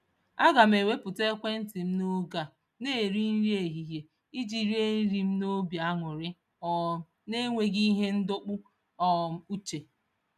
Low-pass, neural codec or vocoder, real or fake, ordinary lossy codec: 14.4 kHz; none; real; none